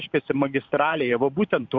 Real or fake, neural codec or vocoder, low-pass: fake; vocoder, 44.1 kHz, 128 mel bands every 512 samples, BigVGAN v2; 7.2 kHz